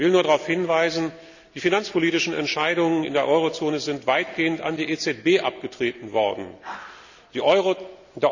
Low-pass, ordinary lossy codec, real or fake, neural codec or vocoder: 7.2 kHz; none; real; none